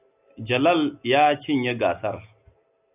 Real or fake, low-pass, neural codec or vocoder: real; 3.6 kHz; none